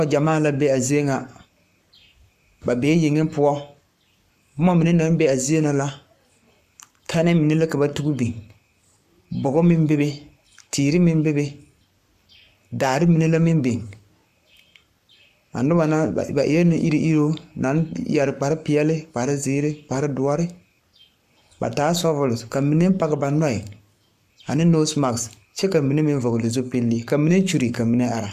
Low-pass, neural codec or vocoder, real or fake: 14.4 kHz; codec, 44.1 kHz, 7.8 kbps, Pupu-Codec; fake